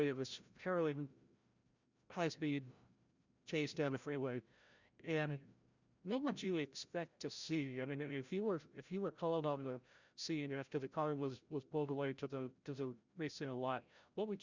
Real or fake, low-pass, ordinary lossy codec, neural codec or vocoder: fake; 7.2 kHz; Opus, 64 kbps; codec, 16 kHz, 0.5 kbps, FreqCodec, larger model